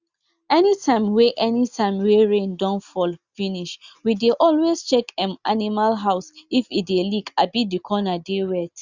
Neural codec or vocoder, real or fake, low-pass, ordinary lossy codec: none; real; 7.2 kHz; Opus, 64 kbps